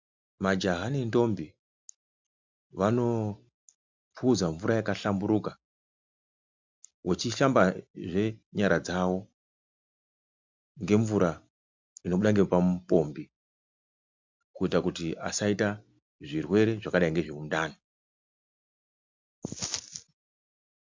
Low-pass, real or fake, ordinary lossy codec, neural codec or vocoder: 7.2 kHz; real; MP3, 64 kbps; none